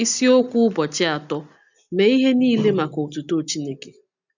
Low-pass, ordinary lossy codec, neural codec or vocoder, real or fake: 7.2 kHz; none; none; real